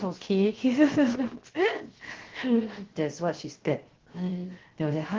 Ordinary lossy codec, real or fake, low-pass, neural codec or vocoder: Opus, 16 kbps; fake; 7.2 kHz; codec, 16 kHz, 0.7 kbps, FocalCodec